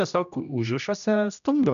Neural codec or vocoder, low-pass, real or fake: codec, 16 kHz, 1 kbps, X-Codec, HuBERT features, trained on general audio; 7.2 kHz; fake